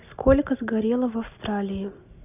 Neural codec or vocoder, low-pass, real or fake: none; 3.6 kHz; real